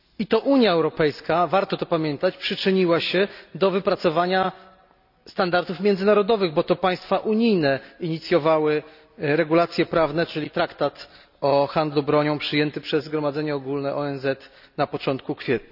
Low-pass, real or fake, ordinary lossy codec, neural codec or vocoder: 5.4 kHz; real; none; none